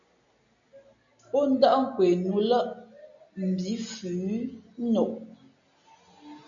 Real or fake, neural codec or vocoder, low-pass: real; none; 7.2 kHz